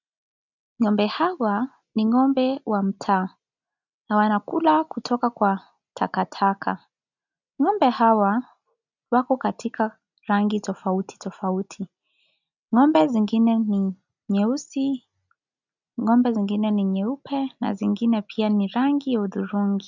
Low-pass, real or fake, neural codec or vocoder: 7.2 kHz; real; none